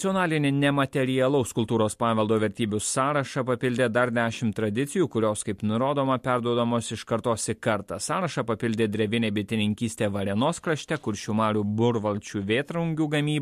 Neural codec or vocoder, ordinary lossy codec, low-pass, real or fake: none; MP3, 64 kbps; 14.4 kHz; real